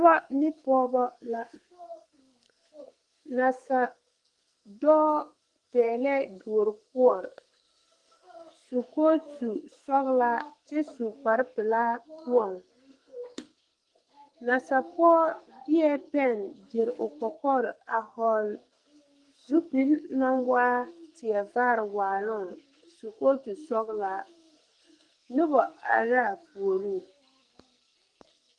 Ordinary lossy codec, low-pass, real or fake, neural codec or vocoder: Opus, 24 kbps; 10.8 kHz; fake; codec, 44.1 kHz, 2.6 kbps, SNAC